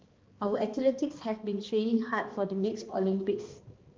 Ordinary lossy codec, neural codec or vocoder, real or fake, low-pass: Opus, 32 kbps; codec, 16 kHz, 2 kbps, X-Codec, HuBERT features, trained on balanced general audio; fake; 7.2 kHz